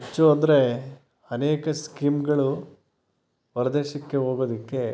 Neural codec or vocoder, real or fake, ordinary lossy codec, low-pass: none; real; none; none